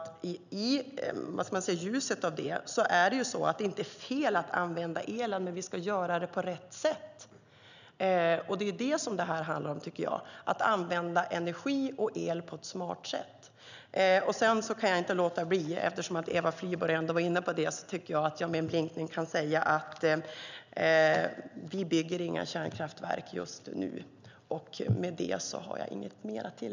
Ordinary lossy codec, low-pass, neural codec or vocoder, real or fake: none; 7.2 kHz; none; real